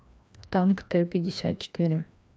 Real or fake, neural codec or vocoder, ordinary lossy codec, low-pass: fake; codec, 16 kHz, 1 kbps, FreqCodec, larger model; none; none